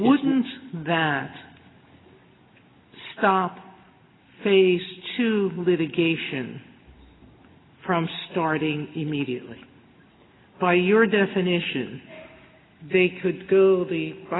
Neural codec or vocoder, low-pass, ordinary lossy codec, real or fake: none; 7.2 kHz; AAC, 16 kbps; real